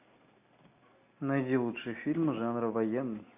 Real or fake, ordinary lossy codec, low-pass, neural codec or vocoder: real; AAC, 32 kbps; 3.6 kHz; none